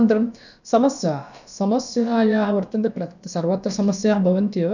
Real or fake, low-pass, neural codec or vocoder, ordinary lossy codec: fake; 7.2 kHz; codec, 16 kHz, about 1 kbps, DyCAST, with the encoder's durations; none